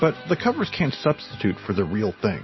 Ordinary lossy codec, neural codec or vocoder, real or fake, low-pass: MP3, 24 kbps; none; real; 7.2 kHz